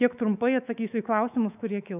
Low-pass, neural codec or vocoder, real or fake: 3.6 kHz; codec, 24 kHz, 3.1 kbps, DualCodec; fake